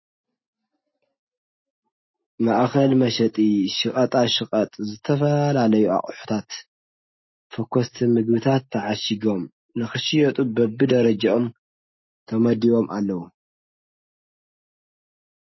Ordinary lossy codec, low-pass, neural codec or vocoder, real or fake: MP3, 24 kbps; 7.2 kHz; none; real